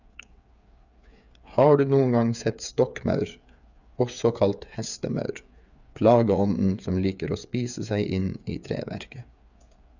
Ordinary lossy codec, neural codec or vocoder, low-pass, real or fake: none; codec, 16 kHz, 8 kbps, FreqCodec, smaller model; 7.2 kHz; fake